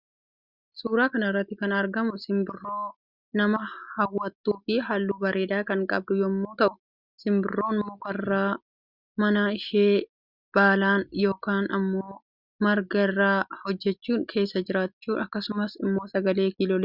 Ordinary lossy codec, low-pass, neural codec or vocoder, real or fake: Opus, 64 kbps; 5.4 kHz; none; real